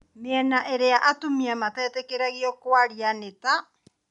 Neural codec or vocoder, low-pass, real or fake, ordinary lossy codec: none; 10.8 kHz; real; none